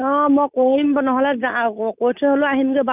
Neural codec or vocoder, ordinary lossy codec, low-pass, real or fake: none; none; 3.6 kHz; real